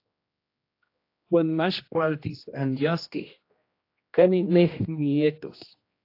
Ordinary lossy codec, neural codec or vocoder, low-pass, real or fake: AAC, 32 kbps; codec, 16 kHz, 1 kbps, X-Codec, HuBERT features, trained on general audio; 5.4 kHz; fake